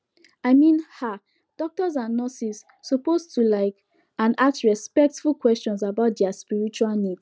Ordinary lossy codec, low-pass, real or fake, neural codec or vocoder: none; none; real; none